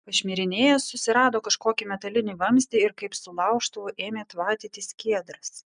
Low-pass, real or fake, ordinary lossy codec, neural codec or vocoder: 9.9 kHz; real; MP3, 96 kbps; none